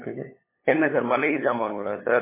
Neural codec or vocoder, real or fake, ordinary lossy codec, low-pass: codec, 16 kHz, 8 kbps, FunCodec, trained on LibriTTS, 25 frames a second; fake; MP3, 16 kbps; 3.6 kHz